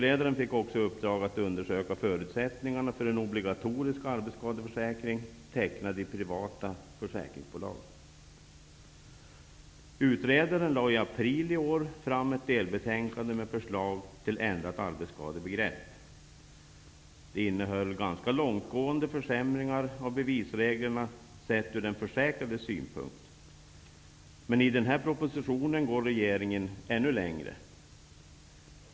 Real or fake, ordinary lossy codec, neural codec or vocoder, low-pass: real; none; none; none